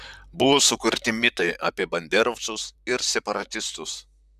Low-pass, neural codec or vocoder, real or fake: 14.4 kHz; vocoder, 44.1 kHz, 128 mel bands, Pupu-Vocoder; fake